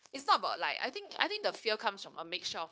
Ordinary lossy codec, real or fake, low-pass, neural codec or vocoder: none; fake; none; codec, 16 kHz, 0.9 kbps, LongCat-Audio-Codec